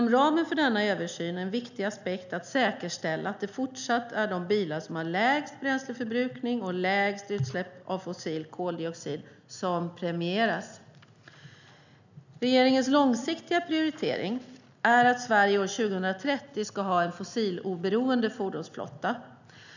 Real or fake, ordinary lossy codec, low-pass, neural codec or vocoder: real; none; 7.2 kHz; none